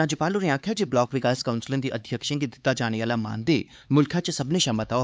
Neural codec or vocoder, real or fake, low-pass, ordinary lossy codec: codec, 16 kHz, 4 kbps, X-Codec, HuBERT features, trained on LibriSpeech; fake; none; none